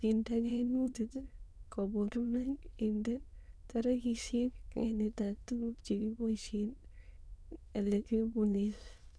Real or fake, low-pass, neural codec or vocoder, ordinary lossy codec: fake; none; autoencoder, 22.05 kHz, a latent of 192 numbers a frame, VITS, trained on many speakers; none